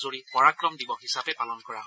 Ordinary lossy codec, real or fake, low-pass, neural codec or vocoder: none; real; none; none